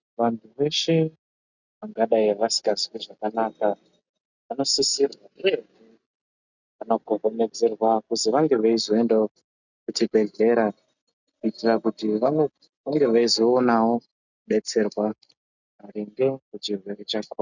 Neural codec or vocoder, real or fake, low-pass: none; real; 7.2 kHz